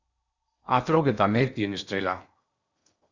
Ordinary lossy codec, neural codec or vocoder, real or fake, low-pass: Opus, 64 kbps; codec, 16 kHz in and 24 kHz out, 0.8 kbps, FocalCodec, streaming, 65536 codes; fake; 7.2 kHz